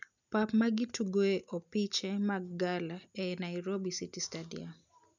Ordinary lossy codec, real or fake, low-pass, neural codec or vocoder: none; real; 7.2 kHz; none